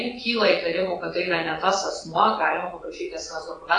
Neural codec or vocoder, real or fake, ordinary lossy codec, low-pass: vocoder, 22.05 kHz, 80 mel bands, Vocos; fake; AAC, 32 kbps; 9.9 kHz